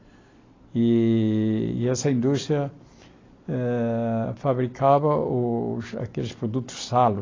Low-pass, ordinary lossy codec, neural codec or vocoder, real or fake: 7.2 kHz; AAC, 32 kbps; none; real